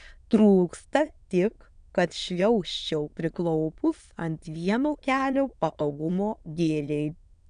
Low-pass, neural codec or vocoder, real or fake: 9.9 kHz; autoencoder, 22.05 kHz, a latent of 192 numbers a frame, VITS, trained on many speakers; fake